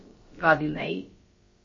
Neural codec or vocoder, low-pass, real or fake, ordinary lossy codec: codec, 16 kHz, about 1 kbps, DyCAST, with the encoder's durations; 7.2 kHz; fake; MP3, 32 kbps